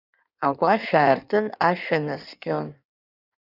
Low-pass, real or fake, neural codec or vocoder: 5.4 kHz; fake; codec, 16 kHz in and 24 kHz out, 1.1 kbps, FireRedTTS-2 codec